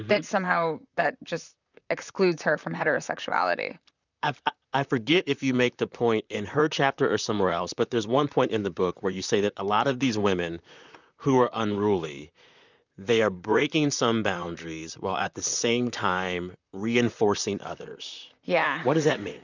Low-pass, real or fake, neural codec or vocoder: 7.2 kHz; fake; vocoder, 44.1 kHz, 128 mel bands, Pupu-Vocoder